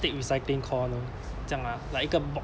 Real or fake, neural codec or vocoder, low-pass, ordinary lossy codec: real; none; none; none